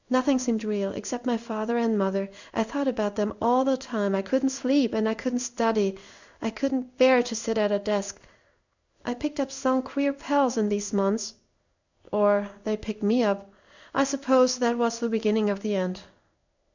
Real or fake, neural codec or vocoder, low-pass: fake; codec, 16 kHz in and 24 kHz out, 1 kbps, XY-Tokenizer; 7.2 kHz